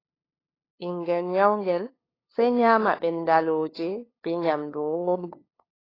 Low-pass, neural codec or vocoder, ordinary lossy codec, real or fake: 5.4 kHz; codec, 16 kHz, 2 kbps, FunCodec, trained on LibriTTS, 25 frames a second; AAC, 24 kbps; fake